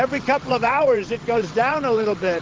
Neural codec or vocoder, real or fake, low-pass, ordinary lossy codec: vocoder, 22.05 kHz, 80 mel bands, WaveNeXt; fake; 7.2 kHz; Opus, 16 kbps